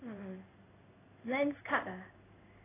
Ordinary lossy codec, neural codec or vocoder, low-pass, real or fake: AAC, 16 kbps; codec, 16 kHz in and 24 kHz out, 1 kbps, XY-Tokenizer; 3.6 kHz; fake